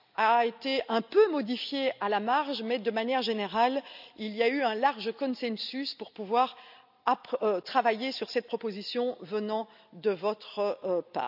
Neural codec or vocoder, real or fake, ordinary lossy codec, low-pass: none; real; none; 5.4 kHz